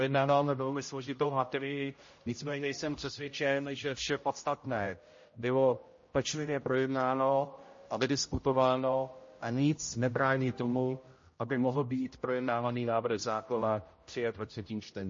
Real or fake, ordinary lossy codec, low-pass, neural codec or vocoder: fake; MP3, 32 kbps; 7.2 kHz; codec, 16 kHz, 0.5 kbps, X-Codec, HuBERT features, trained on general audio